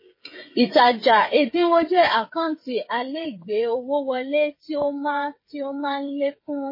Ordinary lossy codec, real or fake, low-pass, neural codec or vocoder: MP3, 24 kbps; fake; 5.4 kHz; codec, 16 kHz, 8 kbps, FreqCodec, smaller model